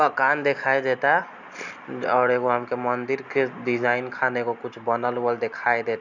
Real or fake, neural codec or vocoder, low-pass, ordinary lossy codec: real; none; 7.2 kHz; none